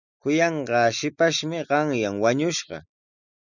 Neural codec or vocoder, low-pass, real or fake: none; 7.2 kHz; real